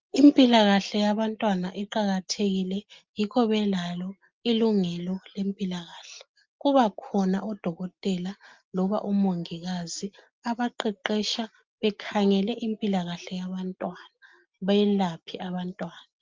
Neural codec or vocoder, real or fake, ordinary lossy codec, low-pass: none; real; Opus, 24 kbps; 7.2 kHz